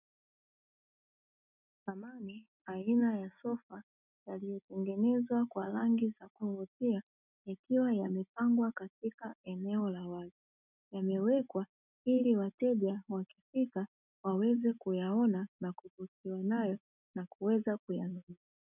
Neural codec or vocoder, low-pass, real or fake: none; 3.6 kHz; real